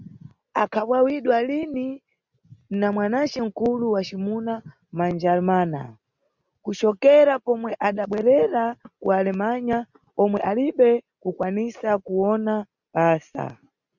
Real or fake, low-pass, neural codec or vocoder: real; 7.2 kHz; none